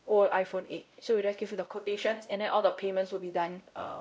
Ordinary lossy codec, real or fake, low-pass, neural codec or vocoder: none; fake; none; codec, 16 kHz, 0.5 kbps, X-Codec, WavLM features, trained on Multilingual LibriSpeech